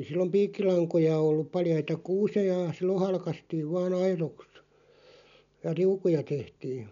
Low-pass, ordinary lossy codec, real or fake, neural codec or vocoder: 7.2 kHz; none; real; none